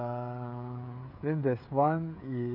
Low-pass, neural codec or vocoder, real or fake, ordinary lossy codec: 5.4 kHz; codec, 16 kHz, 16 kbps, FreqCodec, smaller model; fake; none